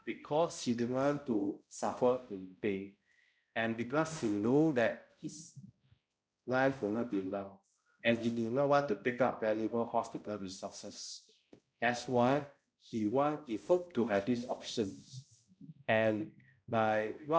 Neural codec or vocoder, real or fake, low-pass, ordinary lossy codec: codec, 16 kHz, 0.5 kbps, X-Codec, HuBERT features, trained on balanced general audio; fake; none; none